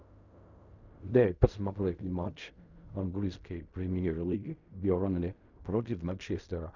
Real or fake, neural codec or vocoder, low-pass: fake; codec, 16 kHz in and 24 kHz out, 0.4 kbps, LongCat-Audio-Codec, fine tuned four codebook decoder; 7.2 kHz